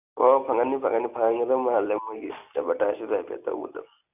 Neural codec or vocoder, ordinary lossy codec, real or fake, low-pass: none; none; real; 3.6 kHz